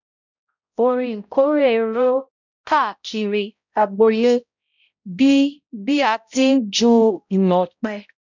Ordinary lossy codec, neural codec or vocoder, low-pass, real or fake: none; codec, 16 kHz, 0.5 kbps, X-Codec, HuBERT features, trained on balanced general audio; 7.2 kHz; fake